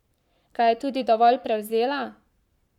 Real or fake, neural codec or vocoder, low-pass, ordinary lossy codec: fake; codec, 44.1 kHz, 7.8 kbps, Pupu-Codec; 19.8 kHz; none